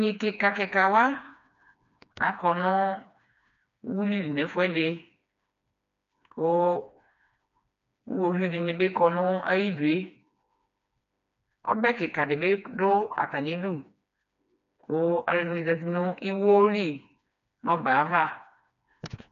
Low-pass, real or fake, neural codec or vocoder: 7.2 kHz; fake; codec, 16 kHz, 2 kbps, FreqCodec, smaller model